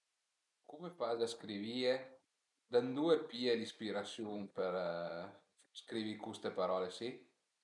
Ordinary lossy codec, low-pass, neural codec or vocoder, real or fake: none; 10.8 kHz; none; real